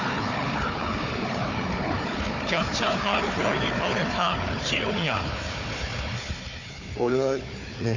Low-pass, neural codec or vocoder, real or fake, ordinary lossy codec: 7.2 kHz; codec, 16 kHz, 4 kbps, FunCodec, trained on Chinese and English, 50 frames a second; fake; AAC, 48 kbps